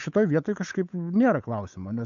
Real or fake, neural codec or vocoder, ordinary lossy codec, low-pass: fake; codec, 16 kHz, 8 kbps, FunCodec, trained on Chinese and English, 25 frames a second; MP3, 64 kbps; 7.2 kHz